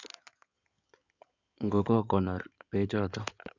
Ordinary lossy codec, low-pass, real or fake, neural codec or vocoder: none; 7.2 kHz; fake; codec, 16 kHz in and 24 kHz out, 2.2 kbps, FireRedTTS-2 codec